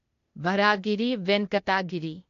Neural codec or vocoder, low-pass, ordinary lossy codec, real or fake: codec, 16 kHz, 0.8 kbps, ZipCodec; 7.2 kHz; MP3, 48 kbps; fake